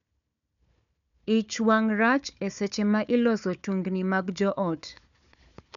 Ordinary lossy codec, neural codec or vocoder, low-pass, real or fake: none; codec, 16 kHz, 4 kbps, FunCodec, trained on Chinese and English, 50 frames a second; 7.2 kHz; fake